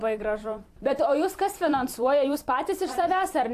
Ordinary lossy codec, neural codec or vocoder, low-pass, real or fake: AAC, 64 kbps; vocoder, 44.1 kHz, 128 mel bands every 256 samples, BigVGAN v2; 14.4 kHz; fake